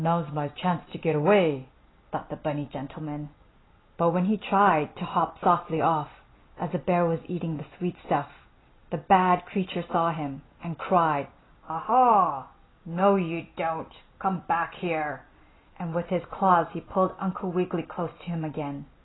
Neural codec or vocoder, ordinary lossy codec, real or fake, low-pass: none; AAC, 16 kbps; real; 7.2 kHz